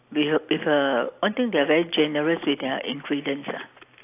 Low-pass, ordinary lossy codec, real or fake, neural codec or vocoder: 3.6 kHz; AAC, 24 kbps; real; none